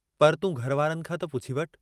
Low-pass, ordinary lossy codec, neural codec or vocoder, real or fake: 14.4 kHz; Opus, 32 kbps; none; real